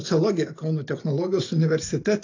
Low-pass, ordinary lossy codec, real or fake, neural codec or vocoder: 7.2 kHz; AAC, 32 kbps; real; none